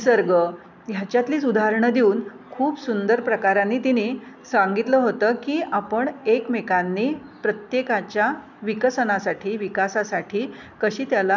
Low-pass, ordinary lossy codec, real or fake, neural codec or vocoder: 7.2 kHz; none; real; none